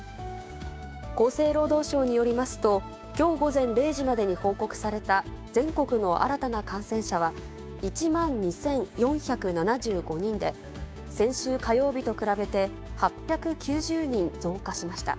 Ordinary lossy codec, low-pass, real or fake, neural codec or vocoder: none; none; fake; codec, 16 kHz, 6 kbps, DAC